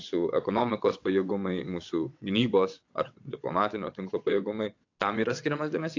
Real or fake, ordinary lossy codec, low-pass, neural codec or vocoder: fake; AAC, 48 kbps; 7.2 kHz; vocoder, 22.05 kHz, 80 mel bands, WaveNeXt